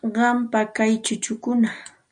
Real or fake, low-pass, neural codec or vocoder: real; 9.9 kHz; none